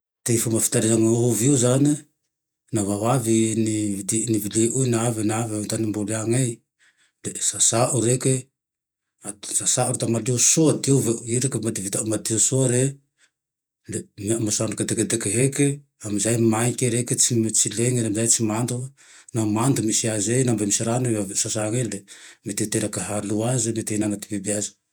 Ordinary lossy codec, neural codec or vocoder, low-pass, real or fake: none; vocoder, 48 kHz, 128 mel bands, Vocos; none; fake